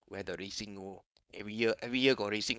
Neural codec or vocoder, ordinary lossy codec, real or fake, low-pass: codec, 16 kHz, 4.8 kbps, FACodec; none; fake; none